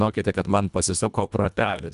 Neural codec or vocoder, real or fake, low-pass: codec, 24 kHz, 1.5 kbps, HILCodec; fake; 10.8 kHz